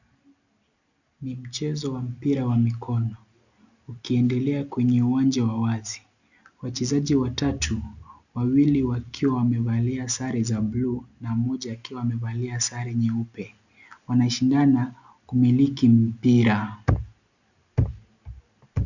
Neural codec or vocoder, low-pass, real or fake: none; 7.2 kHz; real